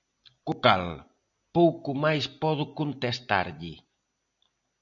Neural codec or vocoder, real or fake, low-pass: none; real; 7.2 kHz